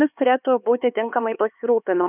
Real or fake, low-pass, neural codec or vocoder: fake; 3.6 kHz; codec, 16 kHz, 4 kbps, X-Codec, HuBERT features, trained on LibriSpeech